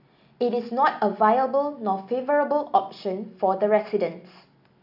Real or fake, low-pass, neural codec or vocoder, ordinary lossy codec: real; 5.4 kHz; none; none